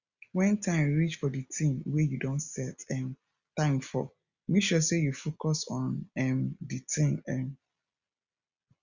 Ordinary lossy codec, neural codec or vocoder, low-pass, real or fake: Opus, 64 kbps; none; 7.2 kHz; real